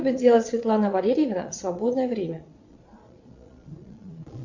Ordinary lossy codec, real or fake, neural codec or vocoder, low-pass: Opus, 64 kbps; fake; vocoder, 22.05 kHz, 80 mel bands, Vocos; 7.2 kHz